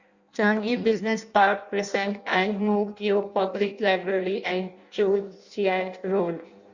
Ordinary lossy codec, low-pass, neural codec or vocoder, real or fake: Opus, 64 kbps; 7.2 kHz; codec, 16 kHz in and 24 kHz out, 0.6 kbps, FireRedTTS-2 codec; fake